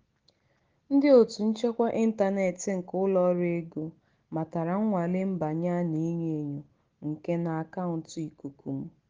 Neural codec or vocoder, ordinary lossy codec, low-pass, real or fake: none; Opus, 16 kbps; 7.2 kHz; real